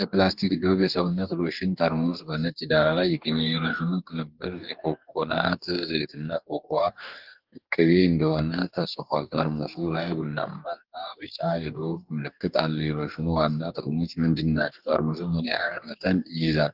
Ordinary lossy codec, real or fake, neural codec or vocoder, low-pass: Opus, 24 kbps; fake; codec, 44.1 kHz, 2.6 kbps, DAC; 5.4 kHz